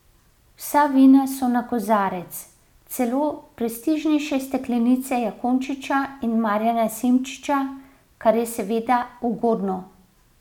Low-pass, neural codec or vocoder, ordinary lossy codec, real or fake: 19.8 kHz; none; none; real